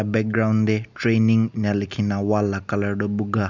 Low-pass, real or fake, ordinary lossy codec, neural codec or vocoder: 7.2 kHz; real; none; none